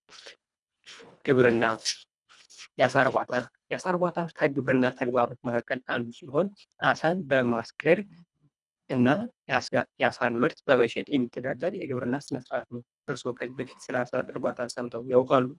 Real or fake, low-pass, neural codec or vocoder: fake; 10.8 kHz; codec, 24 kHz, 1.5 kbps, HILCodec